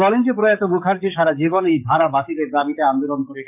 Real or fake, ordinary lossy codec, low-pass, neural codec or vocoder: fake; none; 3.6 kHz; codec, 44.1 kHz, 7.8 kbps, DAC